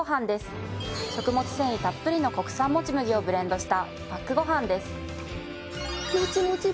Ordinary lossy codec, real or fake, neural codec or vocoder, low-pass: none; real; none; none